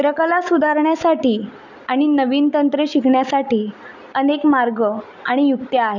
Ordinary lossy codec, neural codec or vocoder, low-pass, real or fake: none; none; 7.2 kHz; real